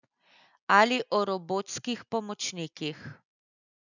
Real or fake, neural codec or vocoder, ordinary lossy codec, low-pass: real; none; none; 7.2 kHz